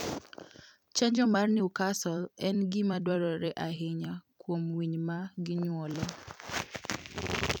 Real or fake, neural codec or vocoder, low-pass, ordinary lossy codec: fake; vocoder, 44.1 kHz, 128 mel bands every 256 samples, BigVGAN v2; none; none